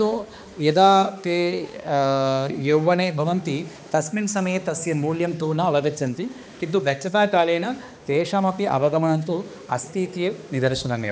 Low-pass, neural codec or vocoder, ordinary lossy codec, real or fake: none; codec, 16 kHz, 2 kbps, X-Codec, HuBERT features, trained on balanced general audio; none; fake